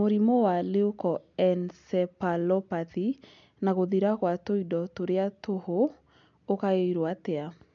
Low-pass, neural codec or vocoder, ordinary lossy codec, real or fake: 7.2 kHz; none; MP3, 64 kbps; real